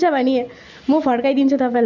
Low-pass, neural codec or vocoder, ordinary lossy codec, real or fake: 7.2 kHz; none; none; real